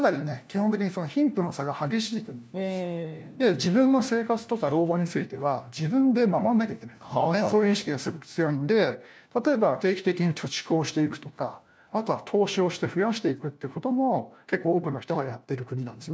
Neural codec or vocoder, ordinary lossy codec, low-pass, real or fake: codec, 16 kHz, 1 kbps, FunCodec, trained on LibriTTS, 50 frames a second; none; none; fake